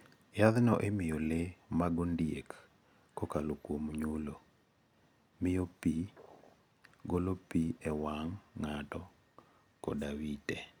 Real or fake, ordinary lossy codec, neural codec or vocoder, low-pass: real; none; none; 19.8 kHz